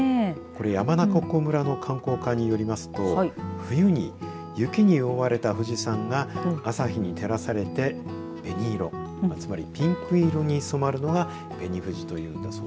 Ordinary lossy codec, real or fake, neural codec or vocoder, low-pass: none; real; none; none